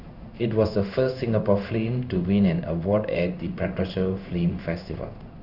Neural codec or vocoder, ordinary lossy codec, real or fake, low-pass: codec, 16 kHz in and 24 kHz out, 1 kbps, XY-Tokenizer; none; fake; 5.4 kHz